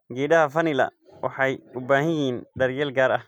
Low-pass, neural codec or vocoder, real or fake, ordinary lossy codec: 19.8 kHz; none; real; none